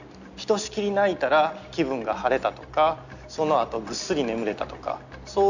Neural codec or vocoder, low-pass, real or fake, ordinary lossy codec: none; 7.2 kHz; real; none